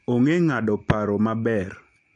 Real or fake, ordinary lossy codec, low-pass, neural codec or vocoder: real; MP3, 48 kbps; 9.9 kHz; none